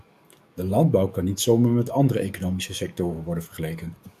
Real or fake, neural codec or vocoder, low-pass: fake; codec, 44.1 kHz, 7.8 kbps, DAC; 14.4 kHz